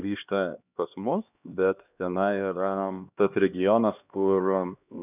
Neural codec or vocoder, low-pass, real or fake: codec, 16 kHz, 2 kbps, X-Codec, HuBERT features, trained on LibriSpeech; 3.6 kHz; fake